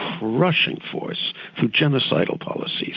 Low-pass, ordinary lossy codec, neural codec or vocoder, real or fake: 7.2 kHz; AAC, 48 kbps; none; real